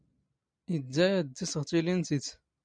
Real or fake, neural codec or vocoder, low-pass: real; none; 9.9 kHz